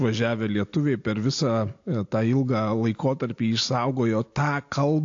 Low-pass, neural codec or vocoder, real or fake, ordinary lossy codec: 7.2 kHz; none; real; AAC, 48 kbps